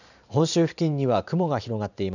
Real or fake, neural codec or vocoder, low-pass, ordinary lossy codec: fake; vocoder, 44.1 kHz, 128 mel bands every 512 samples, BigVGAN v2; 7.2 kHz; none